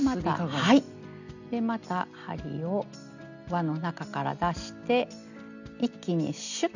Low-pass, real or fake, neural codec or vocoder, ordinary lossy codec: 7.2 kHz; real; none; none